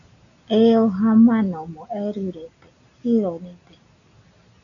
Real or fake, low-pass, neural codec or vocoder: real; 7.2 kHz; none